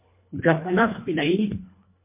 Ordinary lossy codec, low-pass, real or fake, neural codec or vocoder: MP3, 24 kbps; 3.6 kHz; fake; codec, 24 kHz, 1.5 kbps, HILCodec